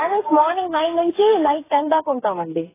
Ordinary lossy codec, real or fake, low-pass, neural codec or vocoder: AAC, 16 kbps; fake; 3.6 kHz; codec, 16 kHz, 4 kbps, FreqCodec, smaller model